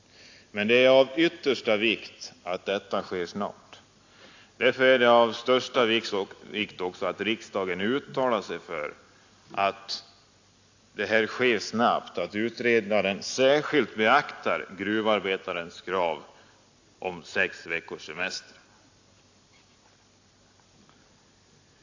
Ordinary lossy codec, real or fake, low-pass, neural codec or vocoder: AAC, 48 kbps; real; 7.2 kHz; none